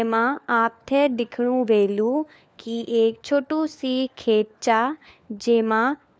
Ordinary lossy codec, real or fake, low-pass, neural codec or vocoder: none; fake; none; codec, 16 kHz, 4 kbps, FunCodec, trained on LibriTTS, 50 frames a second